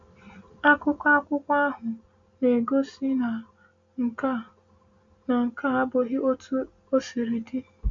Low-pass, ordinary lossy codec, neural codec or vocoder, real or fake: 7.2 kHz; MP3, 48 kbps; none; real